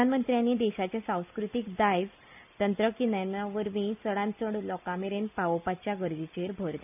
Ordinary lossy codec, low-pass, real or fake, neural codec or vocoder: none; 3.6 kHz; real; none